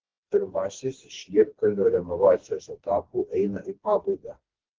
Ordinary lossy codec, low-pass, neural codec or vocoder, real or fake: Opus, 16 kbps; 7.2 kHz; codec, 16 kHz, 2 kbps, FreqCodec, smaller model; fake